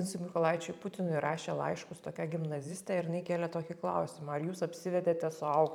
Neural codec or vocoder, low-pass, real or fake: vocoder, 44.1 kHz, 128 mel bands every 512 samples, BigVGAN v2; 19.8 kHz; fake